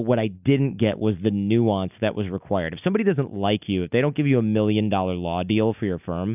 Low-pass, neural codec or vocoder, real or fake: 3.6 kHz; none; real